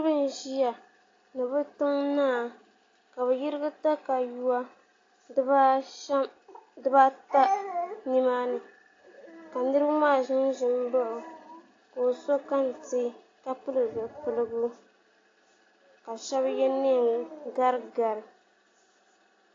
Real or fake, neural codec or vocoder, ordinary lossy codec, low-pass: real; none; AAC, 32 kbps; 7.2 kHz